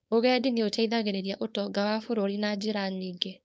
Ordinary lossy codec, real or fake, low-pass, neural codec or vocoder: none; fake; none; codec, 16 kHz, 4.8 kbps, FACodec